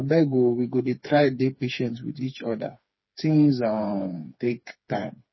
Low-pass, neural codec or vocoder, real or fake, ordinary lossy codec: 7.2 kHz; codec, 16 kHz, 4 kbps, FreqCodec, smaller model; fake; MP3, 24 kbps